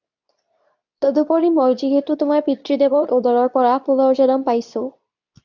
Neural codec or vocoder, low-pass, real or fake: codec, 24 kHz, 0.9 kbps, WavTokenizer, medium speech release version 2; 7.2 kHz; fake